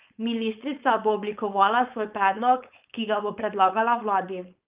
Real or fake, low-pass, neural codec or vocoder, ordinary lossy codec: fake; 3.6 kHz; codec, 16 kHz, 4.8 kbps, FACodec; Opus, 32 kbps